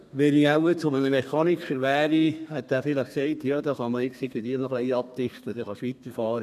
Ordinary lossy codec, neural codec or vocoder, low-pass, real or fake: none; codec, 32 kHz, 1.9 kbps, SNAC; 14.4 kHz; fake